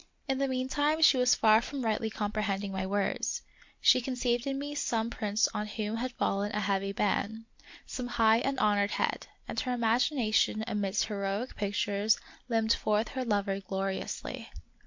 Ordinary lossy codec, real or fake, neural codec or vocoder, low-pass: MP3, 48 kbps; real; none; 7.2 kHz